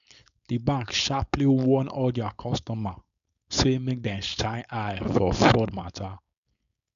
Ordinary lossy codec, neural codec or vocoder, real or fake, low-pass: none; codec, 16 kHz, 4.8 kbps, FACodec; fake; 7.2 kHz